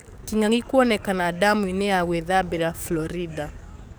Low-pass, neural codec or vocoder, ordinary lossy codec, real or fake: none; codec, 44.1 kHz, 7.8 kbps, DAC; none; fake